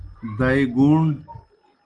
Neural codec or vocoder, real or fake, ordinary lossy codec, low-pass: none; real; Opus, 24 kbps; 9.9 kHz